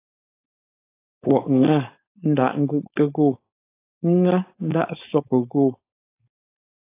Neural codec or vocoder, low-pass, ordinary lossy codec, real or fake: codec, 16 kHz, 4.8 kbps, FACodec; 3.6 kHz; AAC, 24 kbps; fake